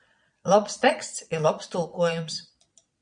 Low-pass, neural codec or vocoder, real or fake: 9.9 kHz; vocoder, 22.05 kHz, 80 mel bands, Vocos; fake